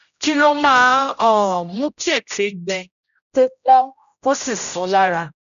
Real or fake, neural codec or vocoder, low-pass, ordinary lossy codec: fake; codec, 16 kHz, 0.5 kbps, X-Codec, HuBERT features, trained on general audio; 7.2 kHz; none